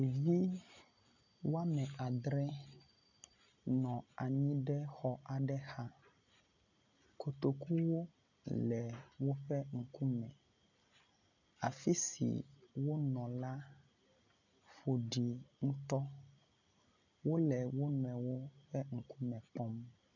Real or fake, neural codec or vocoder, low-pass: real; none; 7.2 kHz